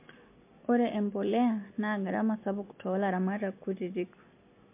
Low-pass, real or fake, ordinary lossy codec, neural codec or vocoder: 3.6 kHz; real; MP3, 24 kbps; none